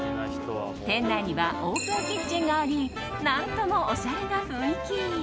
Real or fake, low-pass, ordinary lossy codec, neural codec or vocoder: real; none; none; none